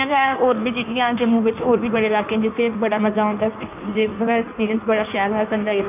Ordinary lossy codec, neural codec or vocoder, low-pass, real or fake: none; codec, 16 kHz in and 24 kHz out, 1.1 kbps, FireRedTTS-2 codec; 3.6 kHz; fake